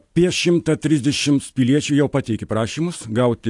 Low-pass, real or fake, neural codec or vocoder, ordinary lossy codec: 10.8 kHz; real; none; AAC, 64 kbps